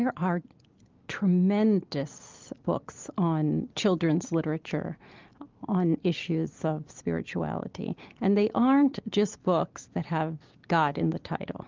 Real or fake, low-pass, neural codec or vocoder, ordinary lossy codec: real; 7.2 kHz; none; Opus, 32 kbps